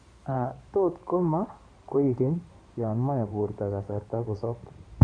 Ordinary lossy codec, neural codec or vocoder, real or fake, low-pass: MP3, 96 kbps; codec, 16 kHz in and 24 kHz out, 2.2 kbps, FireRedTTS-2 codec; fake; 9.9 kHz